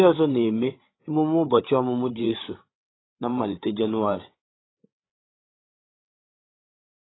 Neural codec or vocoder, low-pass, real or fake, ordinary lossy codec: codec, 16 kHz, 8 kbps, FreqCodec, larger model; 7.2 kHz; fake; AAC, 16 kbps